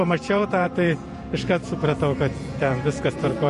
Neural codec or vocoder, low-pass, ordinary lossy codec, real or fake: vocoder, 48 kHz, 128 mel bands, Vocos; 14.4 kHz; MP3, 48 kbps; fake